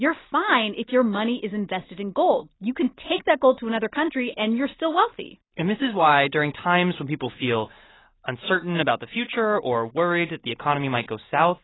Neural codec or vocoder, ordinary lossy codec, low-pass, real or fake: none; AAC, 16 kbps; 7.2 kHz; real